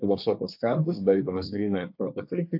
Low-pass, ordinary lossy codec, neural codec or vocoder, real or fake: 5.4 kHz; AAC, 48 kbps; codec, 24 kHz, 1 kbps, SNAC; fake